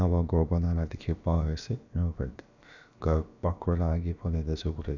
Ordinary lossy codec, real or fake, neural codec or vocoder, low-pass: none; fake; codec, 16 kHz, about 1 kbps, DyCAST, with the encoder's durations; 7.2 kHz